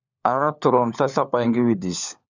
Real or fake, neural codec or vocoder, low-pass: fake; codec, 16 kHz, 4 kbps, FunCodec, trained on LibriTTS, 50 frames a second; 7.2 kHz